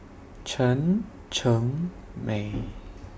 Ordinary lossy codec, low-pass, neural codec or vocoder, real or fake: none; none; none; real